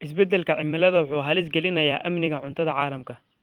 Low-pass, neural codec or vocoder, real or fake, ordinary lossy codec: 19.8 kHz; vocoder, 44.1 kHz, 128 mel bands every 256 samples, BigVGAN v2; fake; Opus, 24 kbps